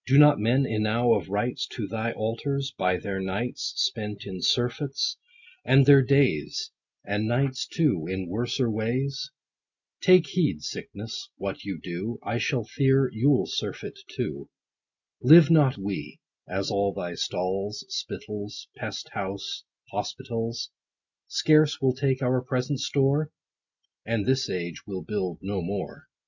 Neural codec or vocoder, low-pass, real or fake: none; 7.2 kHz; real